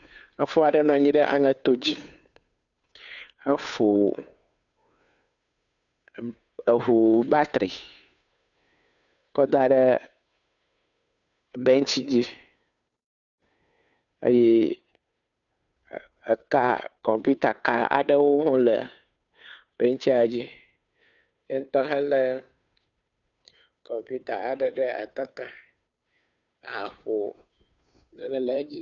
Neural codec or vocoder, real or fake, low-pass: codec, 16 kHz, 2 kbps, FunCodec, trained on Chinese and English, 25 frames a second; fake; 7.2 kHz